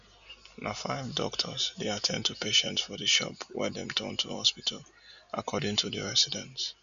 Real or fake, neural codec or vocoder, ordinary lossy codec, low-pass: real; none; none; 7.2 kHz